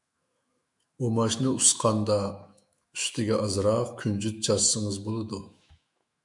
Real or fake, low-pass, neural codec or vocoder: fake; 10.8 kHz; autoencoder, 48 kHz, 128 numbers a frame, DAC-VAE, trained on Japanese speech